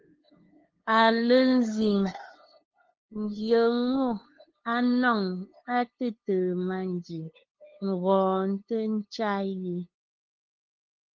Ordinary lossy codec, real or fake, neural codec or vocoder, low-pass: Opus, 16 kbps; fake; codec, 16 kHz, 2 kbps, FunCodec, trained on LibriTTS, 25 frames a second; 7.2 kHz